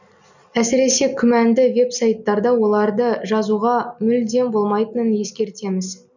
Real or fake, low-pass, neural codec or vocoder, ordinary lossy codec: real; 7.2 kHz; none; none